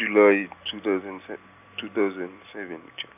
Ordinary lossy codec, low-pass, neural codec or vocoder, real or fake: none; 3.6 kHz; none; real